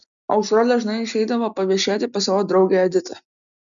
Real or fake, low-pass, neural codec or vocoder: real; 7.2 kHz; none